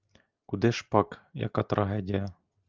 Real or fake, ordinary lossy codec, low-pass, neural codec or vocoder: real; Opus, 32 kbps; 7.2 kHz; none